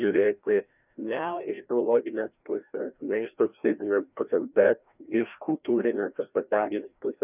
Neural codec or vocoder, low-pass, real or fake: codec, 16 kHz, 1 kbps, FreqCodec, larger model; 3.6 kHz; fake